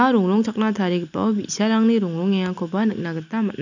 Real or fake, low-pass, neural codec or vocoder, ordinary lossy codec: real; 7.2 kHz; none; none